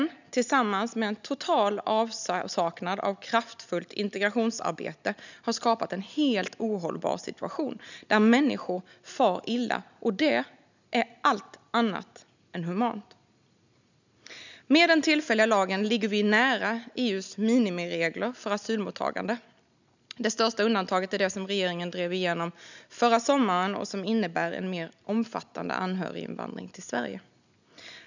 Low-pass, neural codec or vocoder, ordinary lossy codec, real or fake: 7.2 kHz; none; none; real